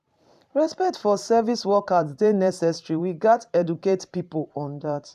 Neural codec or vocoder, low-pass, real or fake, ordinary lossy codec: vocoder, 44.1 kHz, 128 mel bands every 512 samples, BigVGAN v2; 14.4 kHz; fake; AAC, 96 kbps